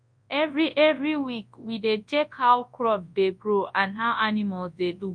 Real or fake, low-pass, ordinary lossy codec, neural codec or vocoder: fake; 9.9 kHz; MP3, 48 kbps; codec, 24 kHz, 0.9 kbps, WavTokenizer, large speech release